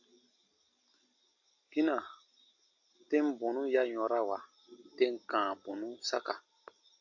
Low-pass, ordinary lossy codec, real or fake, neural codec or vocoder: 7.2 kHz; AAC, 48 kbps; real; none